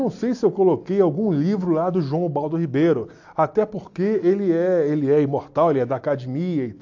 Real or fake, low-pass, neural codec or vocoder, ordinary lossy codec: real; 7.2 kHz; none; AAC, 48 kbps